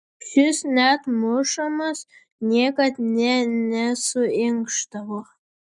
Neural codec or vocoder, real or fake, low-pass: none; real; 10.8 kHz